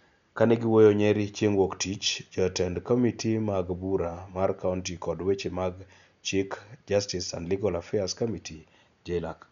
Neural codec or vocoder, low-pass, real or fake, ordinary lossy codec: none; 7.2 kHz; real; none